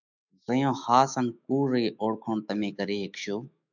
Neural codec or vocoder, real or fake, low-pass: autoencoder, 48 kHz, 128 numbers a frame, DAC-VAE, trained on Japanese speech; fake; 7.2 kHz